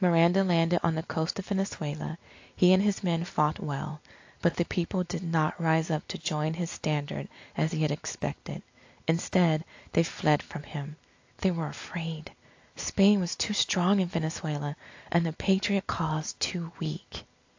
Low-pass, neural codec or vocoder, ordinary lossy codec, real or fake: 7.2 kHz; none; AAC, 48 kbps; real